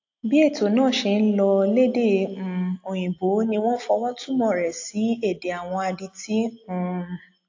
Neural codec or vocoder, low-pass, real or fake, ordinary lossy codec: none; 7.2 kHz; real; AAC, 48 kbps